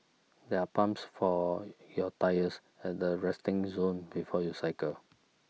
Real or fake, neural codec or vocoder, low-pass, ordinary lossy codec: real; none; none; none